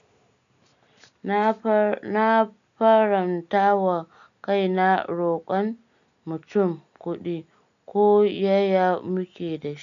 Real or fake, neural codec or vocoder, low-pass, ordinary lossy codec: real; none; 7.2 kHz; none